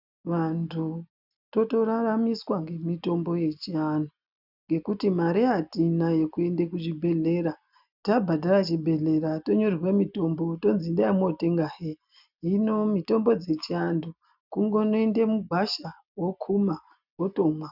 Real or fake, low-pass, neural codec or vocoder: real; 5.4 kHz; none